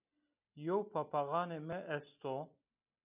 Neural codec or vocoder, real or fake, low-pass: none; real; 3.6 kHz